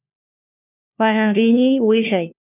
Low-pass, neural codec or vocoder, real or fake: 3.6 kHz; codec, 16 kHz, 1 kbps, FunCodec, trained on LibriTTS, 50 frames a second; fake